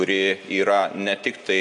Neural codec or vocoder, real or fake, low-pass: none; real; 10.8 kHz